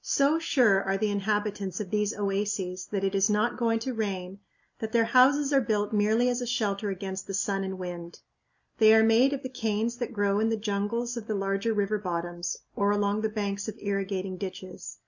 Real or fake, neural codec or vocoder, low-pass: real; none; 7.2 kHz